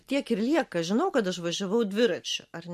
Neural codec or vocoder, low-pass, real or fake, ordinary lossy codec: none; 14.4 kHz; real; MP3, 64 kbps